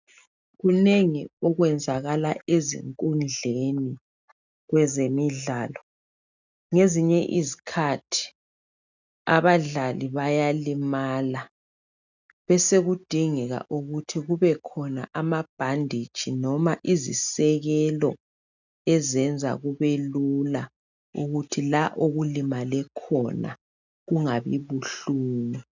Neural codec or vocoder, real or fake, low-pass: none; real; 7.2 kHz